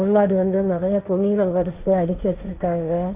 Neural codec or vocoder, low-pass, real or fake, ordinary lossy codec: codec, 16 kHz, 1.1 kbps, Voila-Tokenizer; 3.6 kHz; fake; Opus, 64 kbps